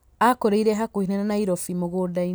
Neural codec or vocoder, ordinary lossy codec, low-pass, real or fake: none; none; none; real